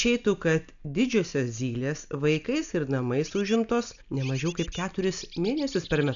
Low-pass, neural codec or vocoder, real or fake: 7.2 kHz; none; real